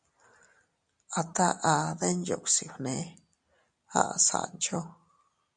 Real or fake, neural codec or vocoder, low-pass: real; none; 9.9 kHz